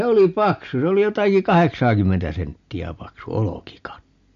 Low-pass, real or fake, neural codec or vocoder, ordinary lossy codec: 7.2 kHz; real; none; MP3, 48 kbps